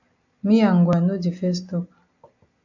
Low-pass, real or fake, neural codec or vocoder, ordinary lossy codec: 7.2 kHz; real; none; AAC, 48 kbps